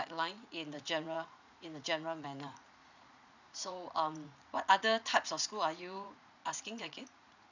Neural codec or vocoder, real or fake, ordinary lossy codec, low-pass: vocoder, 44.1 kHz, 80 mel bands, Vocos; fake; none; 7.2 kHz